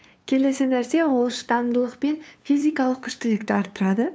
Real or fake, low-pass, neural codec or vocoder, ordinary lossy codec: fake; none; codec, 16 kHz, 2 kbps, FunCodec, trained on LibriTTS, 25 frames a second; none